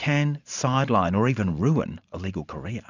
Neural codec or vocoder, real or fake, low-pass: vocoder, 44.1 kHz, 128 mel bands every 512 samples, BigVGAN v2; fake; 7.2 kHz